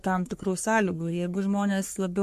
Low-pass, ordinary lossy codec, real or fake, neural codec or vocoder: 14.4 kHz; MP3, 64 kbps; fake; codec, 44.1 kHz, 3.4 kbps, Pupu-Codec